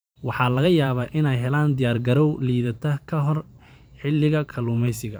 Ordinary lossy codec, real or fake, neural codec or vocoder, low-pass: none; fake; vocoder, 44.1 kHz, 128 mel bands every 512 samples, BigVGAN v2; none